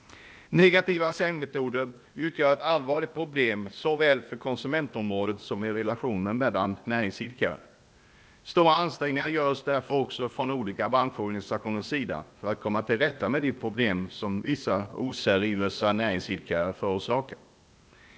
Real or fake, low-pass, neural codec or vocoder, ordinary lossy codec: fake; none; codec, 16 kHz, 0.8 kbps, ZipCodec; none